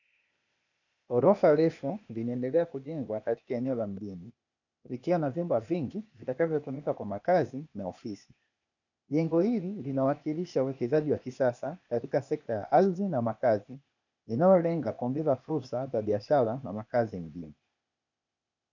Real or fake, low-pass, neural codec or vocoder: fake; 7.2 kHz; codec, 16 kHz, 0.8 kbps, ZipCodec